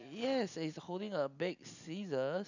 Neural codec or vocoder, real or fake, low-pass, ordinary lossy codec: none; real; 7.2 kHz; none